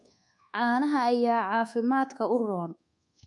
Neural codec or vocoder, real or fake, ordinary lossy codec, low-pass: codec, 24 kHz, 1.2 kbps, DualCodec; fake; MP3, 64 kbps; 10.8 kHz